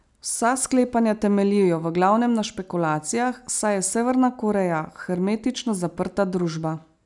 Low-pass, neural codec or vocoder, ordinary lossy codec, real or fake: 10.8 kHz; none; none; real